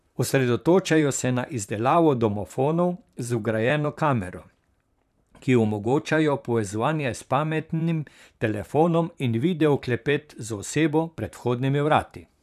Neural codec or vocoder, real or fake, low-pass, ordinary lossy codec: vocoder, 44.1 kHz, 128 mel bands, Pupu-Vocoder; fake; 14.4 kHz; none